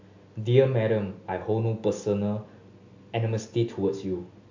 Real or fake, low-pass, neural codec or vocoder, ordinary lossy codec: real; 7.2 kHz; none; MP3, 48 kbps